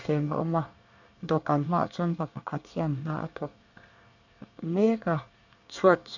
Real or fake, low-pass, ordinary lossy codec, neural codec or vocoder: fake; 7.2 kHz; none; codec, 24 kHz, 1 kbps, SNAC